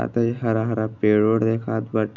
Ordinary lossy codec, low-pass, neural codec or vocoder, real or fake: none; 7.2 kHz; none; real